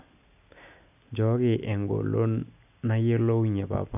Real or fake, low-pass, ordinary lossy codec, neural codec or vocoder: real; 3.6 kHz; AAC, 32 kbps; none